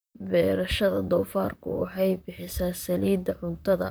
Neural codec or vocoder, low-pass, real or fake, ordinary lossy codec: vocoder, 44.1 kHz, 128 mel bands, Pupu-Vocoder; none; fake; none